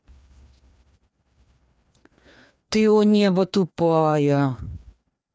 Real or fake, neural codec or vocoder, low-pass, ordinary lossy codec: fake; codec, 16 kHz, 2 kbps, FreqCodec, larger model; none; none